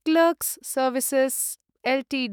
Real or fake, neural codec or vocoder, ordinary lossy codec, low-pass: fake; autoencoder, 48 kHz, 128 numbers a frame, DAC-VAE, trained on Japanese speech; none; none